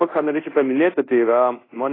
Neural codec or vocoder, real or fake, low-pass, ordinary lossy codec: codec, 24 kHz, 0.5 kbps, DualCodec; fake; 5.4 kHz; AAC, 24 kbps